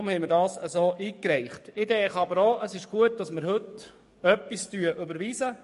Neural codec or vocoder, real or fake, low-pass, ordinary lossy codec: codec, 44.1 kHz, 7.8 kbps, DAC; fake; 14.4 kHz; MP3, 48 kbps